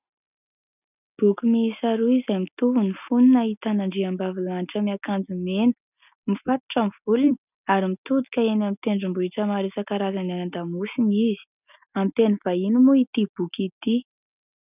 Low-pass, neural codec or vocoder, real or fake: 3.6 kHz; none; real